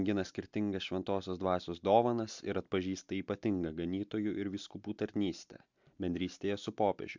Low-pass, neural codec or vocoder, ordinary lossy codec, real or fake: 7.2 kHz; none; MP3, 64 kbps; real